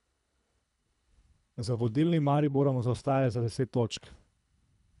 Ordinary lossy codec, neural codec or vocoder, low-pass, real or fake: none; codec, 24 kHz, 3 kbps, HILCodec; 10.8 kHz; fake